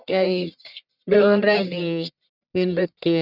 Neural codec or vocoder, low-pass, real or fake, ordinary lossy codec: codec, 44.1 kHz, 1.7 kbps, Pupu-Codec; 5.4 kHz; fake; none